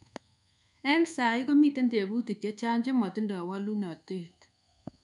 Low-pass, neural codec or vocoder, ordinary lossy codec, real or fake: 10.8 kHz; codec, 24 kHz, 1.2 kbps, DualCodec; none; fake